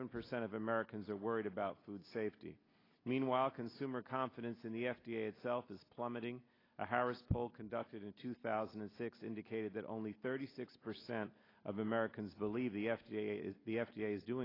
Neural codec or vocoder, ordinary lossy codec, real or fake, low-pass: none; AAC, 24 kbps; real; 5.4 kHz